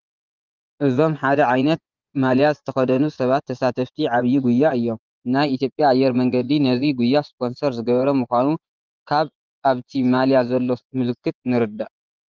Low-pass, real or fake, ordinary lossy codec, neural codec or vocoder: 7.2 kHz; fake; Opus, 24 kbps; vocoder, 24 kHz, 100 mel bands, Vocos